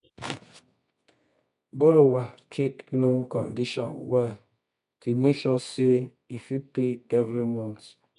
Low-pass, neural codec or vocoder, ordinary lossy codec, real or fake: 10.8 kHz; codec, 24 kHz, 0.9 kbps, WavTokenizer, medium music audio release; MP3, 64 kbps; fake